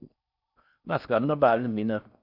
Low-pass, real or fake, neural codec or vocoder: 5.4 kHz; fake; codec, 16 kHz in and 24 kHz out, 0.6 kbps, FocalCodec, streaming, 4096 codes